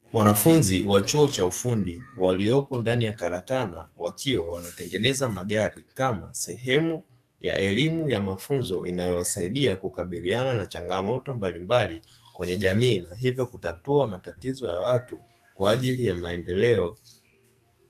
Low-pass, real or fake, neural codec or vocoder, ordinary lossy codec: 14.4 kHz; fake; codec, 44.1 kHz, 2.6 kbps, SNAC; AAC, 96 kbps